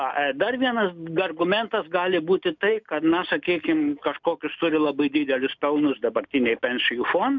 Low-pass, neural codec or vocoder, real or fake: 7.2 kHz; none; real